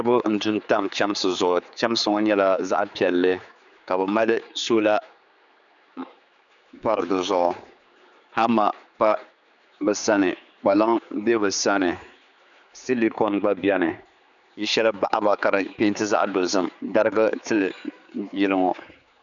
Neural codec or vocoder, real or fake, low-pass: codec, 16 kHz, 4 kbps, X-Codec, HuBERT features, trained on general audio; fake; 7.2 kHz